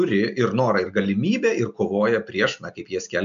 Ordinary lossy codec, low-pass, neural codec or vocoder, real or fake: MP3, 64 kbps; 7.2 kHz; none; real